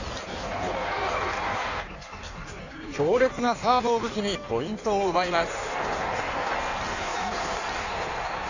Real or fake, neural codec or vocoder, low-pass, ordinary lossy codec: fake; codec, 16 kHz in and 24 kHz out, 1.1 kbps, FireRedTTS-2 codec; 7.2 kHz; none